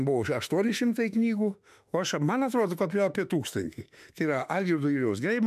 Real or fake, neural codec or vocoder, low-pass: fake; autoencoder, 48 kHz, 32 numbers a frame, DAC-VAE, trained on Japanese speech; 14.4 kHz